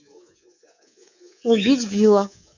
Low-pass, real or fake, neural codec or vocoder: 7.2 kHz; fake; codec, 24 kHz, 3.1 kbps, DualCodec